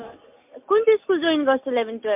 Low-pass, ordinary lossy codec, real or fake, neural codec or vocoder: 3.6 kHz; MP3, 32 kbps; real; none